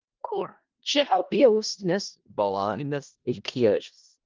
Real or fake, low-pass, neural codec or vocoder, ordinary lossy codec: fake; 7.2 kHz; codec, 16 kHz in and 24 kHz out, 0.4 kbps, LongCat-Audio-Codec, four codebook decoder; Opus, 32 kbps